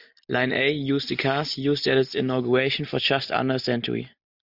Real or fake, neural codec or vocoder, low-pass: real; none; 5.4 kHz